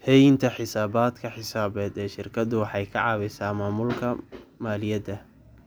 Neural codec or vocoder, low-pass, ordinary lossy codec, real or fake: none; none; none; real